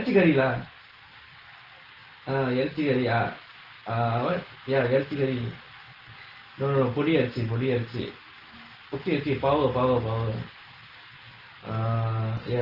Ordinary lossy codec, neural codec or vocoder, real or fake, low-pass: Opus, 16 kbps; none; real; 5.4 kHz